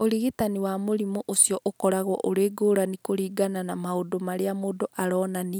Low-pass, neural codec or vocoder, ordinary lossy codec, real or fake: none; none; none; real